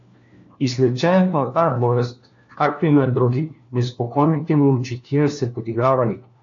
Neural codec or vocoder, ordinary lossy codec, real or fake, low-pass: codec, 16 kHz, 1 kbps, FunCodec, trained on LibriTTS, 50 frames a second; AAC, 48 kbps; fake; 7.2 kHz